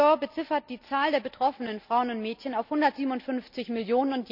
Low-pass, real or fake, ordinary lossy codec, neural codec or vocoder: 5.4 kHz; real; none; none